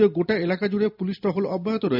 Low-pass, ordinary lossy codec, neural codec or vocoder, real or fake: 5.4 kHz; none; none; real